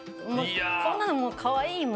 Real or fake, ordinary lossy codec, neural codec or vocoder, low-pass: real; none; none; none